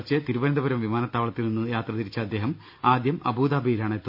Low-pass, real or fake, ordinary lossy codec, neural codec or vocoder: 5.4 kHz; real; none; none